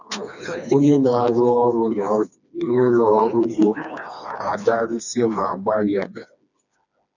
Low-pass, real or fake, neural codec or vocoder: 7.2 kHz; fake; codec, 16 kHz, 2 kbps, FreqCodec, smaller model